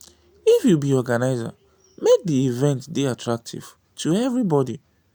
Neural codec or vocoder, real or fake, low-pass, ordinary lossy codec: none; real; none; none